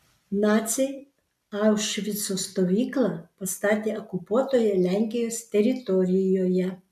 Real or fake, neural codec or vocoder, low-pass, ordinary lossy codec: real; none; 14.4 kHz; MP3, 96 kbps